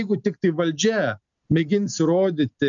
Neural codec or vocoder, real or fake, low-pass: none; real; 7.2 kHz